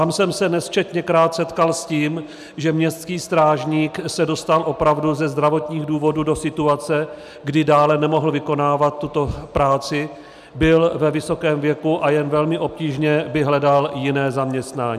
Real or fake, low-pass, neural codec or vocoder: real; 14.4 kHz; none